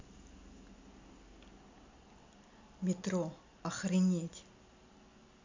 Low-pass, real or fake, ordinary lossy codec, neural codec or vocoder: 7.2 kHz; real; MP3, 64 kbps; none